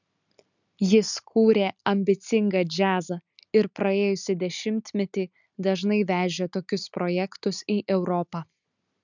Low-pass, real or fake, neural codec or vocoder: 7.2 kHz; real; none